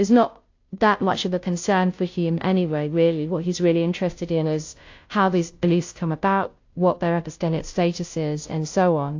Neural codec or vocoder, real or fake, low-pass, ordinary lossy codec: codec, 16 kHz, 0.5 kbps, FunCodec, trained on Chinese and English, 25 frames a second; fake; 7.2 kHz; AAC, 48 kbps